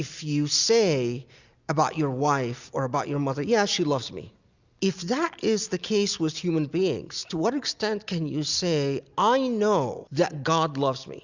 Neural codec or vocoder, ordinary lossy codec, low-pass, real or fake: none; Opus, 64 kbps; 7.2 kHz; real